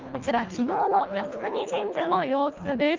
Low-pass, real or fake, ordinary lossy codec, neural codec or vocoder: 7.2 kHz; fake; Opus, 32 kbps; codec, 24 kHz, 1.5 kbps, HILCodec